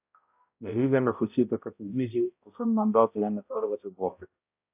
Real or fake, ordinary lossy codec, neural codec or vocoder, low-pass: fake; AAC, 32 kbps; codec, 16 kHz, 0.5 kbps, X-Codec, HuBERT features, trained on balanced general audio; 3.6 kHz